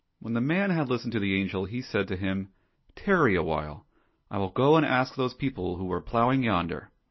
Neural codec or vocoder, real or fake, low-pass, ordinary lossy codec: none; real; 7.2 kHz; MP3, 24 kbps